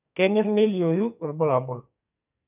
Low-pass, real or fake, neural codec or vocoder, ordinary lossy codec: 3.6 kHz; fake; codec, 44.1 kHz, 2.6 kbps, SNAC; none